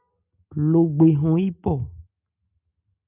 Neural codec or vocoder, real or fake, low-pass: none; real; 3.6 kHz